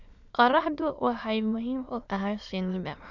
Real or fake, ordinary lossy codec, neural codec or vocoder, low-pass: fake; none; autoencoder, 22.05 kHz, a latent of 192 numbers a frame, VITS, trained on many speakers; 7.2 kHz